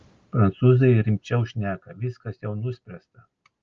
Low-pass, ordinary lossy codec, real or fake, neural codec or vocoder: 7.2 kHz; Opus, 24 kbps; real; none